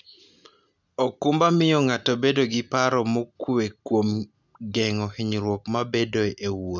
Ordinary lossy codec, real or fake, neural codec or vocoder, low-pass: none; real; none; 7.2 kHz